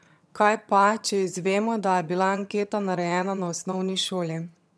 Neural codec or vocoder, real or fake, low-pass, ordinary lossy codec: vocoder, 22.05 kHz, 80 mel bands, HiFi-GAN; fake; none; none